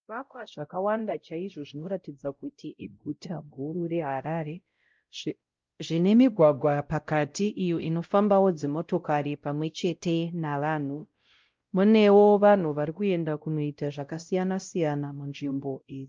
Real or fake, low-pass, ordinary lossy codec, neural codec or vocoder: fake; 7.2 kHz; Opus, 24 kbps; codec, 16 kHz, 0.5 kbps, X-Codec, WavLM features, trained on Multilingual LibriSpeech